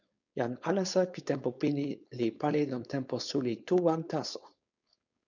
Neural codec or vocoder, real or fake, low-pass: codec, 16 kHz, 4.8 kbps, FACodec; fake; 7.2 kHz